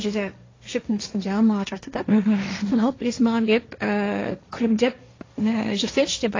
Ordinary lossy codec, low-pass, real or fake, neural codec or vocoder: AAC, 32 kbps; 7.2 kHz; fake; codec, 16 kHz, 1.1 kbps, Voila-Tokenizer